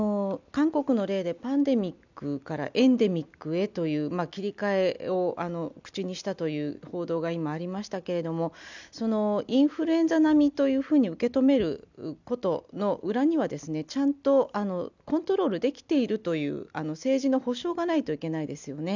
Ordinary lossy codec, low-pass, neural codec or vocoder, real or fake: none; 7.2 kHz; none; real